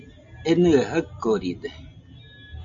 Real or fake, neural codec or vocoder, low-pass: real; none; 7.2 kHz